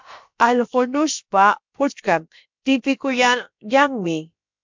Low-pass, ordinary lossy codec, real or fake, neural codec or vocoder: 7.2 kHz; MP3, 64 kbps; fake; codec, 16 kHz, about 1 kbps, DyCAST, with the encoder's durations